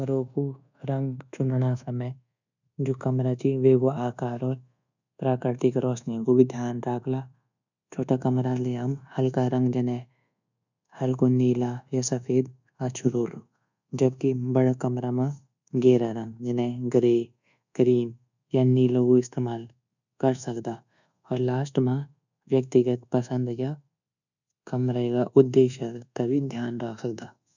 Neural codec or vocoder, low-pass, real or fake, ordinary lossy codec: codec, 24 kHz, 1.2 kbps, DualCodec; 7.2 kHz; fake; none